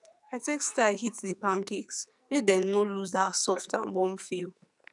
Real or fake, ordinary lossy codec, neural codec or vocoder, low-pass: fake; none; codec, 44.1 kHz, 2.6 kbps, SNAC; 10.8 kHz